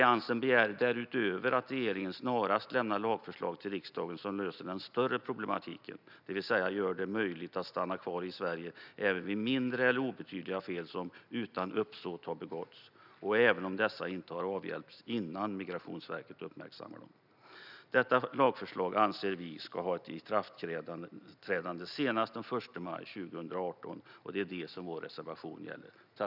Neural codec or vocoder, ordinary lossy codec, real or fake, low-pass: none; none; real; 5.4 kHz